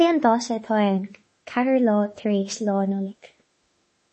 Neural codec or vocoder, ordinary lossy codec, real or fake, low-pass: autoencoder, 48 kHz, 32 numbers a frame, DAC-VAE, trained on Japanese speech; MP3, 32 kbps; fake; 10.8 kHz